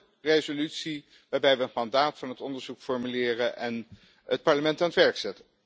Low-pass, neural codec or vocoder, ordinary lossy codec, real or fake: none; none; none; real